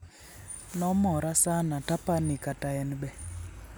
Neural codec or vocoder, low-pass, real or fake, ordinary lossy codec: none; none; real; none